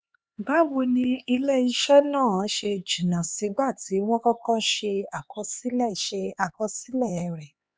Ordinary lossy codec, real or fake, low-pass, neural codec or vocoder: none; fake; none; codec, 16 kHz, 4 kbps, X-Codec, HuBERT features, trained on LibriSpeech